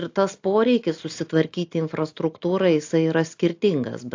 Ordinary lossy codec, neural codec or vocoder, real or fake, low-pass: AAC, 48 kbps; none; real; 7.2 kHz